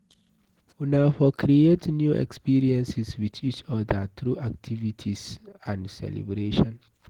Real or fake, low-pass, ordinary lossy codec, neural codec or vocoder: real; 19.8 kHz; Opus, 16 kbps; none